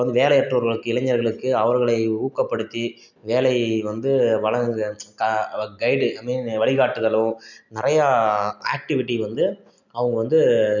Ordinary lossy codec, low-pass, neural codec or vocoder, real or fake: none; 7.2 kHz; none; real